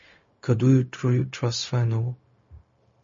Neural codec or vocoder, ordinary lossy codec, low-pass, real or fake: codec, 16 kHz, 0.4 kbps, LongCat-Audio-Codec; MP3, 32 kbps; 7.2 kHz; fake